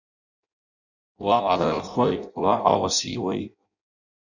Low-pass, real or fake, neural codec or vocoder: 7.2 kHz; fake; codec, 16 kHz in and 24 kHz out, 0.6 kbps, FireRedTTS-2 codec